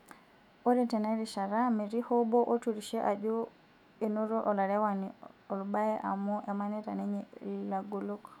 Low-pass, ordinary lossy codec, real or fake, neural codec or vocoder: 19.8 kHz; none; fake; autoencoder, 48 kHz, 128 numbers a frame, DAC-VAE, trained on Japanese speech